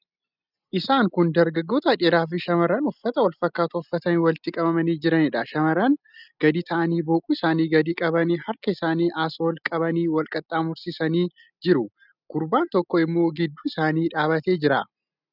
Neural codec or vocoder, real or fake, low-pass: none; real; 5.4 kHz